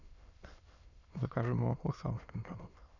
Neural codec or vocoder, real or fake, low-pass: autoencoder, 22.05 kHz, a latent of 192 numbers a frame, VITS, trained on many speakers; fake; 7.2 kHz